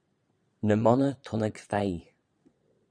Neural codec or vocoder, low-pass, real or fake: vocoder, 22.05 kHz, 80 mel bands, Vocos; 9.9 kHz; fake